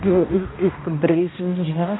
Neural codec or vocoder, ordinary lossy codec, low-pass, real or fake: codec, 16 kHz, 1 kbps, X-Codec, HuBERT features, trained on balanced general audio; AAC, 16 kbps; 7.2 kHz; fake